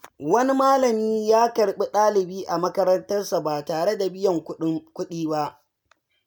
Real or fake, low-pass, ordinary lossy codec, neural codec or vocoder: real; none; none; none